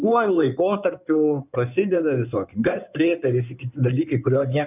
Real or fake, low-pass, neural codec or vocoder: fake; 3.6 kHz; codec, 16 kHz in and 24 kHz out, 2.2 kbps, FireRedTTS-2 codec